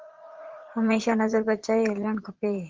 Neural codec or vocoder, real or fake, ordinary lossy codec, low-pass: vocoder, 44.1 kHz, 80 mel bands, Vocos; fake; Opus, 16 kbps; 7.2 kHz